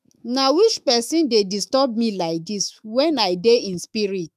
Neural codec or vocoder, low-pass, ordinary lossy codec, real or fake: autoencoder, 48 kHz, 128 numbers a frame, DAC-VAE, trained on Japanese speech; 14.4 kHz; none; fake